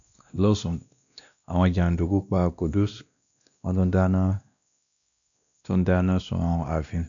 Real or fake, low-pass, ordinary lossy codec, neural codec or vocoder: fake; 7.2 kHz; none; codec, 16 kHz, 1 kbps, X-Codec, WavLM features, trained on Multilingual LibriSpeech